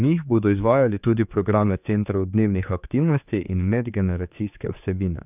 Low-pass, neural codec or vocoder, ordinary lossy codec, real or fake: 3.6 kHz; codec, 16 kHz, 4 kbps, X-Codec, HuBERT features, trained on general audio; none; fake